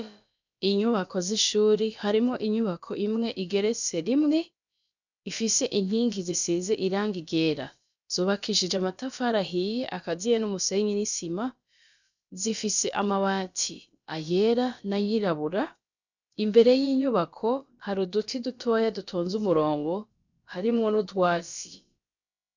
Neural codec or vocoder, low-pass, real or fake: codec, 16 kHz, about 1 kbps, DyCAST, with the encoder's durations; 7.2 kHz; fake